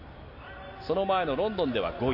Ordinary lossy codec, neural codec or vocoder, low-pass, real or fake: MP3, 32 kbps; none; 5.4 kHz; real